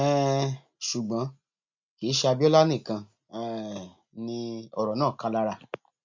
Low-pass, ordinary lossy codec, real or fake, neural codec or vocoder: 7.2 kHz; MP3, 48 kbps; real; none